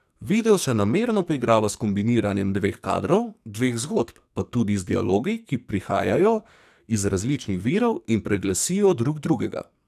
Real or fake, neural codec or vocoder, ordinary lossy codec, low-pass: fake; codec, 44.1 kHz, 2.6 kbps, SNAC; none; 14.4 kHz